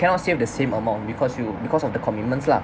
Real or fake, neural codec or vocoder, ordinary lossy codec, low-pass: real; none; none; none